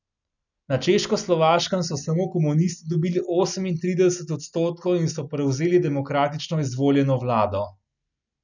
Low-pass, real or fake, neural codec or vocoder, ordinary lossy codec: 7.2 kHz; real; none; none